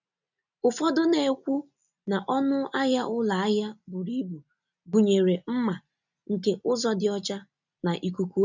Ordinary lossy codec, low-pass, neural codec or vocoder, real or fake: none; 7.2 kHz; none; real